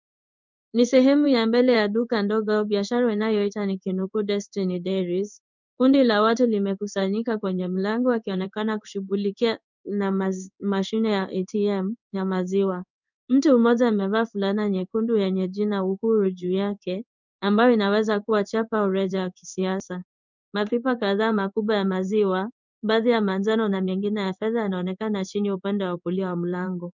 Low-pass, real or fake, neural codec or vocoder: 7.2 kHz; fake; codec, 16 kHz in and 24 kHz out, 1 kbps, XY-Tokenizer